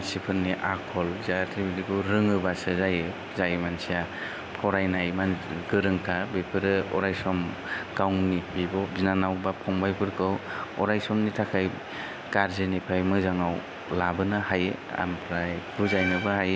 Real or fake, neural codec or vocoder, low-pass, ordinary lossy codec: real; none; none; none